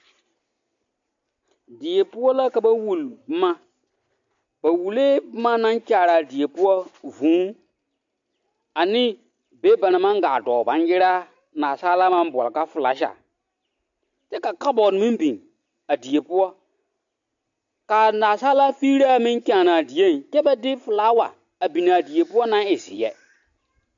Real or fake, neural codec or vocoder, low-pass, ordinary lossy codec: real; none; 7.2 kHz; AAC, 64 kbps